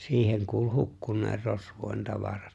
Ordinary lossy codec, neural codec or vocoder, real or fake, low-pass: none; none; real; none